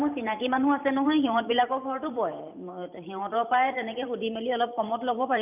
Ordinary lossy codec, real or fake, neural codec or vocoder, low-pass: none; fake; vocoder, 44.1 kHz, 128 mel bands every 512 samples, BigVGAN v2; 3.6 kHz